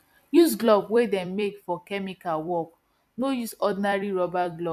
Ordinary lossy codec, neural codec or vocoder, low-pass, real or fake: MP3, 96 kbps; vocoder, 44.1 kHz, 128 mel bands every 256 samples, BigVGAN v2; 14.4 kHz; fake